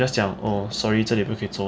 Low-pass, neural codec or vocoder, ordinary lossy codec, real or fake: none; none; none; real